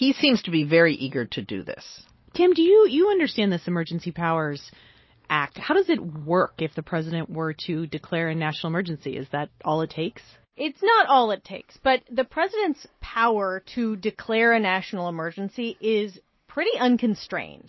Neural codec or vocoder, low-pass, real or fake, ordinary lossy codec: none; 7.2 kHz; real; MP3, 24 kbps